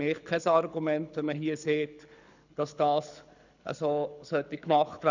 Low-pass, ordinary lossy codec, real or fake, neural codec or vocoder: 7.2 kHz; none; fake; codec, 24 kHz, 6 kbps, HILCodec